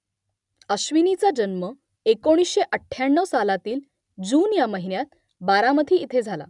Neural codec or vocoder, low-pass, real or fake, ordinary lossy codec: none; 10.8 kHz; real; none